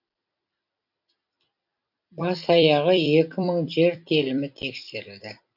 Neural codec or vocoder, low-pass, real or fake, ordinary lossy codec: vocoder, 44.1 kHz, 128 mel bands every 256 samples, BigVGAN v2; 5.4 kHz; fake; none